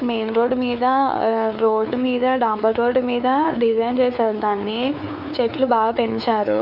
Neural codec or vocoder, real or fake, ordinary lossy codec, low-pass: codec, 16 kHz, 4 kbps, X-Codec, WavLM features, trained on Multilingual LibriSpeech; fake; none; 5.4 kHz